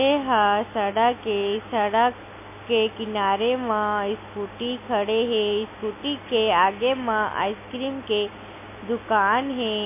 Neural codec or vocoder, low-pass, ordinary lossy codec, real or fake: none; 3.6 kHz; MP3, 24 kbps; real